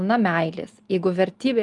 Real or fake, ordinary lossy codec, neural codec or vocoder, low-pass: real; Opus, 32 kbps; none; 10.8 kHz